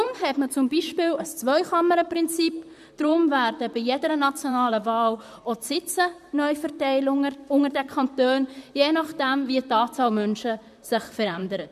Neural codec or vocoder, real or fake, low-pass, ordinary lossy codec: vocoder, 44.1 kHz, 128 mel bands, Pupu-Vocoder; fake; 14.4 kHz; MP3, 96 kbps